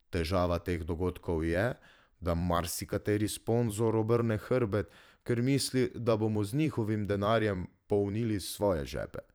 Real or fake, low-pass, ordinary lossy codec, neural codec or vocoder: fake; none; none; vocoder, 44.1 kHz, 128 mel bands every 512 samples, BigVGAN v2